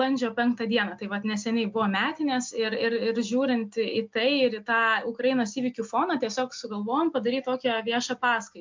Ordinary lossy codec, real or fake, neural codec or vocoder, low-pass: MP3, 64 kbps; real; none; 7.2 kHz